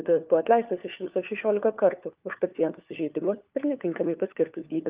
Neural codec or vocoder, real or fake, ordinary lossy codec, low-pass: codec, 16 kHz, 4 kbps, FunCodec, trained on LibriTTS, 50 frames a second; fake; Opus, 24 kbps; 3.6 kHz